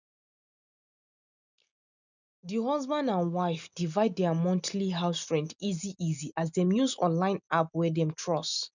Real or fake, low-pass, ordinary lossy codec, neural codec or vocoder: real; 7.2 kHz; none; none